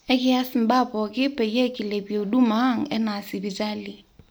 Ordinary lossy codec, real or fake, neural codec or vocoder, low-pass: none; real; none; none